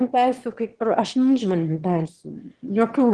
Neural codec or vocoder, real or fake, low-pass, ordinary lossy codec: autoencoder, 22.05 kHz, a latent of 192 numbers a frame, VITS, trained on one speaker; fake; 9.9 kHz; Opus, 16 kbps